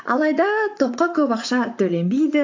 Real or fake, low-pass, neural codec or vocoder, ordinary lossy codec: fake; 7.2 kHz; vocoder, 44.1 kHz, 128 mel bands, Pupu-Vocoder; AAC, 48 kbps